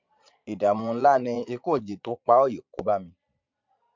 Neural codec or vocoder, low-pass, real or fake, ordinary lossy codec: vocoder, 22.05 kHz, 80 mel bands, Vocos; 7.2 kHz; fake; MP3, 64 kbps